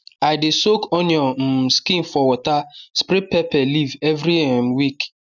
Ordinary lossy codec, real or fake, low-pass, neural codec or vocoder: none; real; 7.2 kHz; none